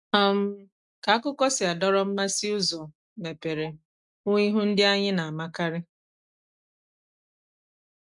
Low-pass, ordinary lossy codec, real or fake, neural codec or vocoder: 10.8 kHz; MP3, 96 kbps; real; none